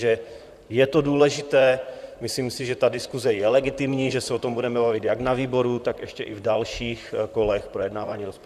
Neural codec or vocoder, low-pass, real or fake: vocoder, 44.1 kHz, 128 mel bands, Pupu-Vocoder; 14.4 kHz; fake